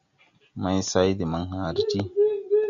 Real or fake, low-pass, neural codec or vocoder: real; 7.2 kHz; none